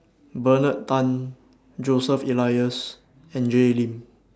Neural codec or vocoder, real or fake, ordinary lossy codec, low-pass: none; real; none; none